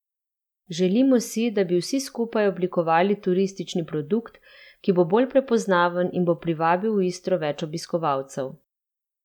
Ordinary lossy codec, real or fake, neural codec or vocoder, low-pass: none; real; none; 19.8 kHz